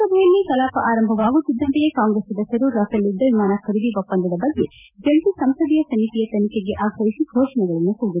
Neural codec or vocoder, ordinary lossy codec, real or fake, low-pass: none; none; real; 3.6 kHz